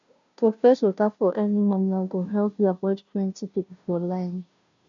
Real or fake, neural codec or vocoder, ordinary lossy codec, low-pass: fake; codec, 16 kHz, 0.5 kbps, FunCodec, trained on Chinese and English, 25 frames a second; none; 7.2 kHz